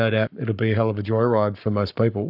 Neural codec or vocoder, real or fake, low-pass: codec, 44.1 kHz, 7.8 kbps, Pupu-Codec; fake; 5.4 kHz